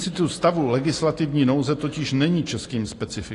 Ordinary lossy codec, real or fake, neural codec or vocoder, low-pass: AAC, 48 kbps; real; none; 10.8 kHz